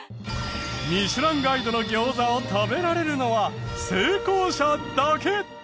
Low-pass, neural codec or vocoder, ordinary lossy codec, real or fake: none; none; none; real